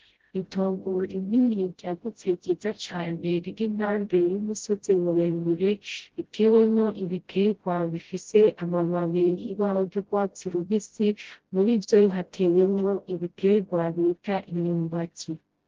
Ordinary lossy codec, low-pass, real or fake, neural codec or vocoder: Opus, 16 kbps; 7.2 kHz; fake; codec, 16 kHz, 0.5 kbps, FreqCodec, smaller model